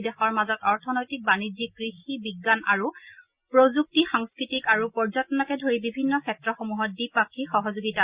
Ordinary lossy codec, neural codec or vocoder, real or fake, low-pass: Opus, 64 kbps; none; real; 3.6 kHz